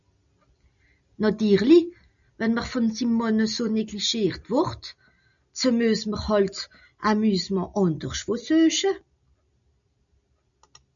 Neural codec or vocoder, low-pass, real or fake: none; 7.2 kHz; real